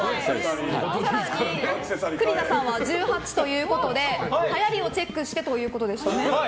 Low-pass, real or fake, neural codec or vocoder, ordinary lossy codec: none; real; none; none